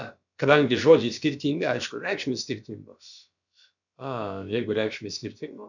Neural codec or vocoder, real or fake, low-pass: codec, 16 kHz, about 1 kbps, DyCAST, with the encoder's durations; fake; 7.2 kHz